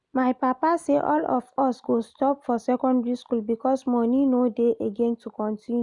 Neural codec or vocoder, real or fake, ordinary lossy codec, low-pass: none; real; none; 10.8 kHz